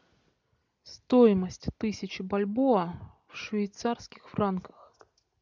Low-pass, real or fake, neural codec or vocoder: 7.2 kHz; real; none